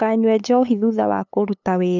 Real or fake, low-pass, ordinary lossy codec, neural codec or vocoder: fake; 7.2 kHz; none; codec, 16 kHz, 8 kbps, FunCodec, trained on LibriTTS, 25 frames a second